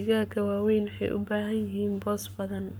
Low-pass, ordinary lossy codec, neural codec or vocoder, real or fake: none; none; codec, 44.1 kHz, 7.8 kbps, Pupu-Codec; fake